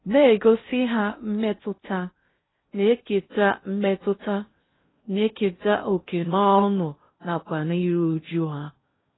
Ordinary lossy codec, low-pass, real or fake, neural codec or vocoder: AAC, 16 kbps; 7.2 kHz; fake; codec, 16 kHz in and 24 kHz out, 0.6 kbps, FocalCodec, streaming, 2048 codes